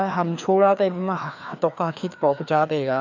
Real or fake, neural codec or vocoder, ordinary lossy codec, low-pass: fake; codec, 16 kHz, 2 kbps, FreqCodec, larger model; none; 7.2 kHz